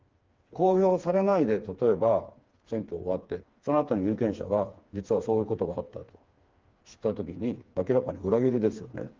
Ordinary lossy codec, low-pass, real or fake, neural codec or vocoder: Opus, 32 kbps; 7.2 kHz; fake; codec, 16 kHz, 4 kbps, FreqCodec, smaller model